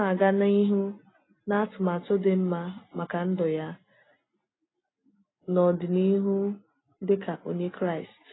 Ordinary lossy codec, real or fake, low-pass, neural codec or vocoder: AAC, 16 kbps; real; 7.2 kHz; none